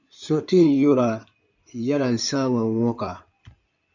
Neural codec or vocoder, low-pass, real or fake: codec, 16 kHz in and 24 kHz out, 2.2 kbps, FireRedTTS-2 codec; 7.2 kHz; fake